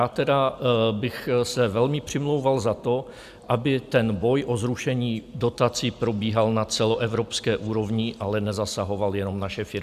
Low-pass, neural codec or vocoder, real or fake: 14.4 kHz; none; real